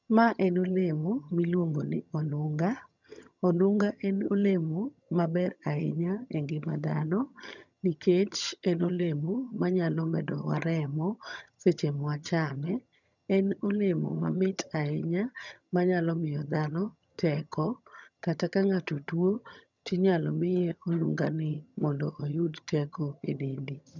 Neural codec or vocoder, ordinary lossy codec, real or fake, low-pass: vocoder, 22.05 kHz, 80 mel bands, HiFi-GAN; none; fake; 7.2 kHz